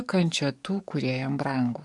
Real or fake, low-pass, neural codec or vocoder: fake; 10.8 kHz; codec, 44.1 kHz, 7.8 kbps, DAC